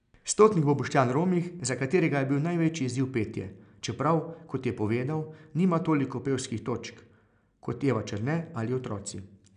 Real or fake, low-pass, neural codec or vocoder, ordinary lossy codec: real; 9.9 kHz; none; none